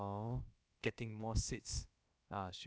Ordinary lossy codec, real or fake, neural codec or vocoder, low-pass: none; fake; codec, 16 kHz, about 1 kbps, DyCAST, with the encoder's durations; none